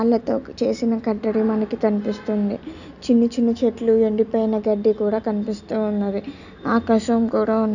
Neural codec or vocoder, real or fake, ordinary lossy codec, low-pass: none; real; none; 7.2 kHz